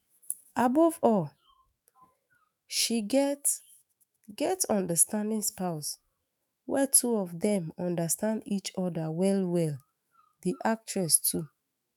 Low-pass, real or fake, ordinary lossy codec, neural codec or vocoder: none; fake; none; autoencoder, 48 kHz, 128 numbers a frame, DAC-VAE, trained on Japanese speech